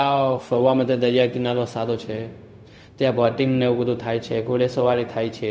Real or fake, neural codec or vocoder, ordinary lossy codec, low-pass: fake; codec, 16 kHz, 0.4 kbps, LongCat-Audio-Codec; none; none